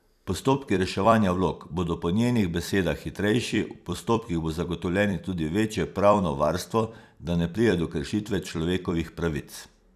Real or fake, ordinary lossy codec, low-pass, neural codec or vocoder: fake; none; 14.4 kHz; vocoder, 44.1 kHz, 128 mel bands every 256 samples, BigVGAN v2